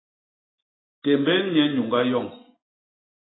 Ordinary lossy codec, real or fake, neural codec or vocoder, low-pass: AAC, 16 kbps; real; none; 7.2 kHz